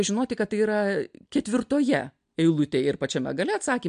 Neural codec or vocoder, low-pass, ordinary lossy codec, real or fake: none; 9.9 kHz; MP3, 64 kbps; real